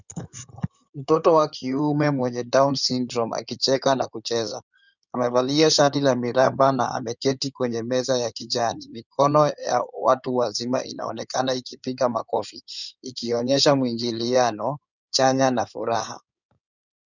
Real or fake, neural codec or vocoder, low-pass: fake; codec, 16 kHz in and 24 kHz out, 2.2 kbps, FireRedTTS-2 codec; 7.2 kHz